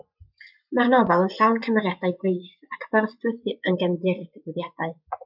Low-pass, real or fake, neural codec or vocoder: 5.4 kHz; fake; vocoder, 24 kHz, 100 mel bands, Vocos